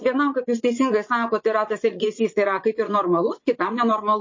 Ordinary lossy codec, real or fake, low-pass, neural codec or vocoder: MP3, 32 kbps; fake; 7.2 kHz; vocoder, 44.1 kHz, 80 mel bands, Vocos